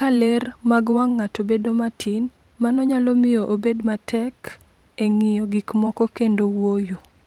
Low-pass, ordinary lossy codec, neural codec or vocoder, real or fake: 19.8 kHz; Opus, 32 kbps; vocoder, 44.1 kHz, 128 mel bands every 512 samples, BigVGAN v2; fake